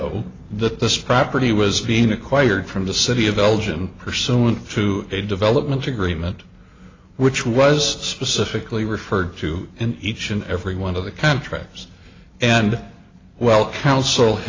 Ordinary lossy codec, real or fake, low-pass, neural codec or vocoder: AAC, 32 kbps; real; 7.2 kHz; none